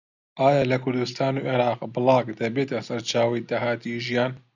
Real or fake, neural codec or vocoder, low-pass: real; none; 7.2 kHz